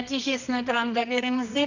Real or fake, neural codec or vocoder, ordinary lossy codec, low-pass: fake; codec, 24 kHz, 0.9 kbps, WavTokenizer, medium music audio release; none; 7.2 kHz